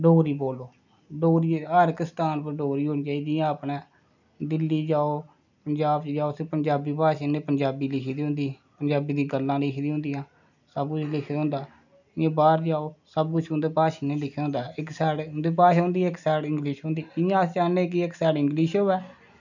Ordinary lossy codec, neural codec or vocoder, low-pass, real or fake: none; none; 7.2 kHz; real